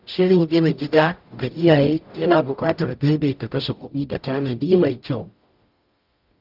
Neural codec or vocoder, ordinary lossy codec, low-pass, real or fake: codec, 44.1 kHz, 0.9 kbps, DAC; Opus, 24 kbps; 5.4 kHz; fake